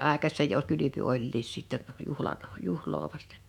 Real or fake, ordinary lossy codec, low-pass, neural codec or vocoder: fake; none; 19.8 kHz; autoencoder, 48 kHz, 128 numbers a frame, DAC-VAE, trained on Japanese speech